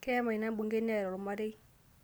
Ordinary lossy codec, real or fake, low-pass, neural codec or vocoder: none; real; none; none